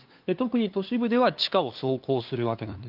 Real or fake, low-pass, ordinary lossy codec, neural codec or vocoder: fake; 5.4 kHz; Opus, 32 kbps; codec, 16 kHz, 2 kbps, FunCodec, trained on LibriTTS, 25 frames a second